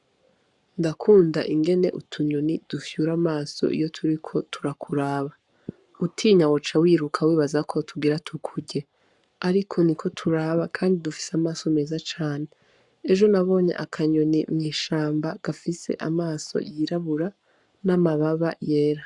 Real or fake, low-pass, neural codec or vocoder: fake; 10.8 kHz; codec, 44.1 kHz, 7.8 kbps, Pupu-Codec